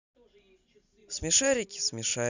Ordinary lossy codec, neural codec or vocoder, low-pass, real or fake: none; none; 7.2 kHz; real